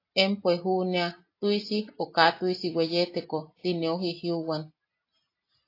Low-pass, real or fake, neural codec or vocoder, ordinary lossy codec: 5.4 kHz; real; none; AAC, 32 kbps